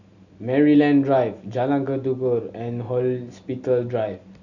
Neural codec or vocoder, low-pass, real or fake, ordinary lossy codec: none; 7.2 kHz; real; none